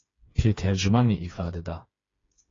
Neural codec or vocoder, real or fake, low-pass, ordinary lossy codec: codec, 16 kHz, 4 kbps, FreqCodec, smaller model; fake; 7.2 kHz; AAC, 32 kbps